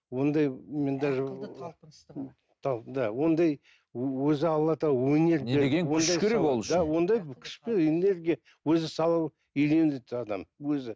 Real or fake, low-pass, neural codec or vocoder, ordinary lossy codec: real; none; none; none